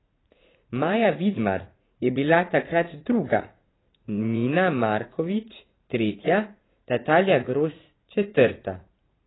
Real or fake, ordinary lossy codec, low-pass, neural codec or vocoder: fake; AAC, 16 kbps; 7.2 kHz; vocoder, 44.1 kHz, 80 mel bands, Vocos